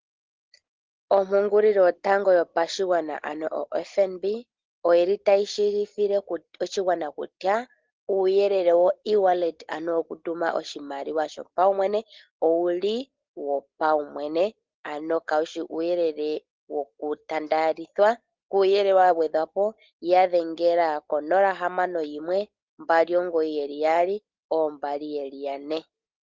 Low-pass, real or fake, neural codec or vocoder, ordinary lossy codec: 7.2 kHz; real; none; Opus, 16 kbps